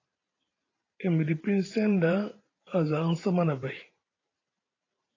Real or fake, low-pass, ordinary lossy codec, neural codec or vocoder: fake; 7.2 kHz; AAC, 32 kbps; vocoder, 44.1 kHz, 128 mel bands every 512 samples, BigVGAN v2